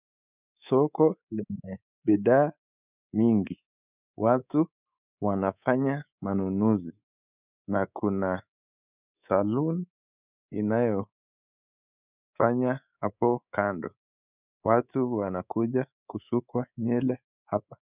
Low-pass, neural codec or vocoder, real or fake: 3.6 kHz; codec, 24 kHz, 3.1 kbps, DualCodec; fake